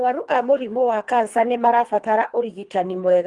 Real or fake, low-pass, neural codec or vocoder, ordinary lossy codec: fake; 10.8 kHz; codec, 24 kHz, 3 kbps, HILCodec; Opus, 24 kbps